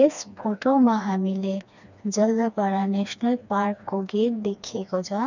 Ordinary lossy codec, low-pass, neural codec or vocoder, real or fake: none; 7.2 kHz; codec, 16 kHz, 2 kbps, FreqCodec, smaller model; fake